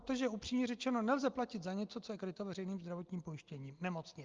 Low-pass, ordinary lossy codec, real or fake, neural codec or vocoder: 7.2 kHz; Opus, 24 kbps; real; none